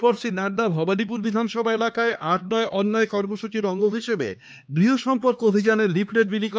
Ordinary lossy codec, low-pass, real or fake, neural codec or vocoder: none; none; fake; codec, 16 kHz, 2 kbps, X-Codec, HuBERT features, trained on LibriSpeech